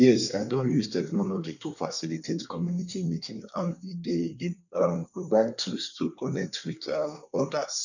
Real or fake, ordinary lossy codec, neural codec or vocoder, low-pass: fake; none; codec, 24 kHz, 1 kbps, SNAC; 7.2 kHz